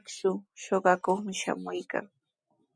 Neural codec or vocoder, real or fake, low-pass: none; real; 9.9 kHz